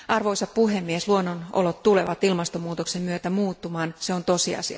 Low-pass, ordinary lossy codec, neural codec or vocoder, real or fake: none; none; none; real